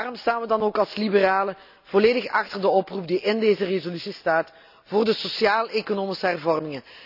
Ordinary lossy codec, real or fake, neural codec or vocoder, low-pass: none; real; none; 5.4 kHz